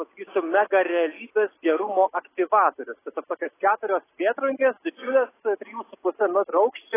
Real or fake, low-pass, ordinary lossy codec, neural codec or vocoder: real; 3.6 kHz; AAC, 16 kbps; none